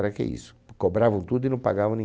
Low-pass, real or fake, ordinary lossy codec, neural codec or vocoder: none; real; none; none